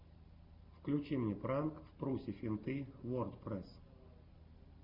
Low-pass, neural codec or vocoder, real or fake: 5.4 kHz; none; real